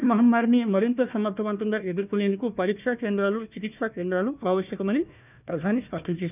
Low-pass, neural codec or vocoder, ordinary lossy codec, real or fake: 3.6 kHz; codec, 16 kHz, 1 kbps, FunCodec, trained on Chinese and English, 50 frames a second; none; fake